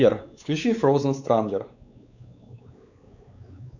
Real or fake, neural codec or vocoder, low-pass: fake; codec, 16 kHz, 4 kbps, X-Codec, WavLM features, trained on Multilingual LibriSpeech; 7.2 kHz